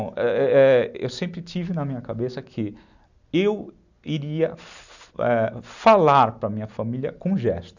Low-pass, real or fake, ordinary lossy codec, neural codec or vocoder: 7.2 kHz; real; none; none